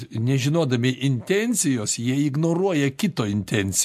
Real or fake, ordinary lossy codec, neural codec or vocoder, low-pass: real; MP3, 64 kbps; none; 14.4 kHz